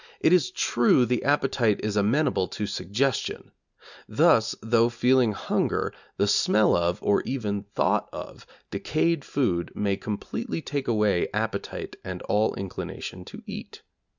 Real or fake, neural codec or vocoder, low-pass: real; none; 7.2 kHz